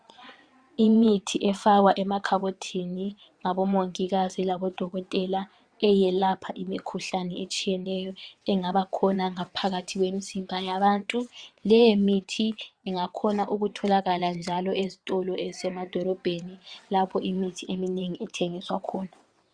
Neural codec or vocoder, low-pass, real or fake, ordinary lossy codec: vocoder, 22.05 kHz, 80 mel bands, WaveNeXt; 9.9 kHz; fake; Opus, 64 kbps